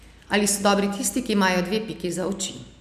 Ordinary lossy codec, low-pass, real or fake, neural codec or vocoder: none; 14.4 kHz; real; none